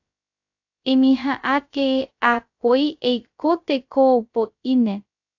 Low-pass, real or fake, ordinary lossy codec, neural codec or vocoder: 7.2 kHz; fake; AAC, 48 kbps; codec, 16 kHz, 0.2 kbps, FocalCodec